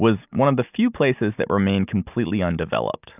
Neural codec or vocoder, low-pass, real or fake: none; 3.6 kHz; real